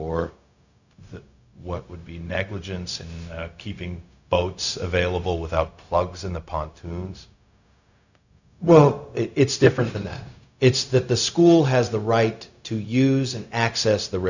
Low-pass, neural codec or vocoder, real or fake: 7.2 kHz; codec, 16 kHz, 0.4 kbps, LongCat-Audio-Codec; fake